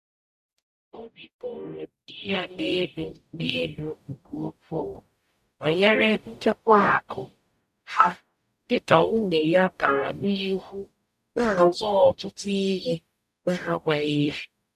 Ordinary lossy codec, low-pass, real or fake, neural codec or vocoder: none; 14.4 kHz; fake; codec, 44.1 kHz, 0.9 kbps, DAC